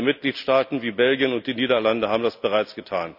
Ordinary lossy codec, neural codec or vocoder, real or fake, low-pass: none; none; real; 5.4 kHz